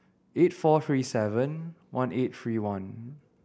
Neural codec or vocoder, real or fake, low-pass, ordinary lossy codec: none; real; none; none